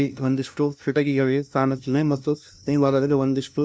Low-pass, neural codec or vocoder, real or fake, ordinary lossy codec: none; codec, 16 kHz, 0.5 kbps, FunCodec, trained on LibriTTS, 25 frames a second; fake; none